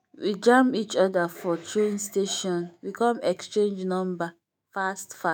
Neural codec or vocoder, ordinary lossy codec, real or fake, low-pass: autoencoder, 48 kHz, 128 numbers a frame, DAC-VAE, trained on Japanese speech; none; fake; none